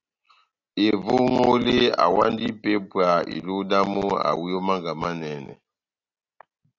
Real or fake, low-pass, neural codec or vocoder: real; 7.2 kHz; none